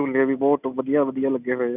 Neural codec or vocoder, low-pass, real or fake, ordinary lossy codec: none; 3.6 kHz; real; none